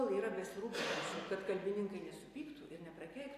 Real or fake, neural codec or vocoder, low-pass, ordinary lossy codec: real; none; 14.4 kHz; AAC, 48 kbps